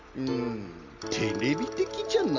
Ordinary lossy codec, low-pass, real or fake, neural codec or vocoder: none; 7.2 kHz; real; none